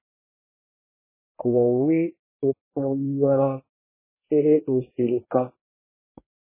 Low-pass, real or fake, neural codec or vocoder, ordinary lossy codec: 3.6 kHz; fake; codec, 24 kHz, 1 kbps, SNAC; MP3, 16 kbps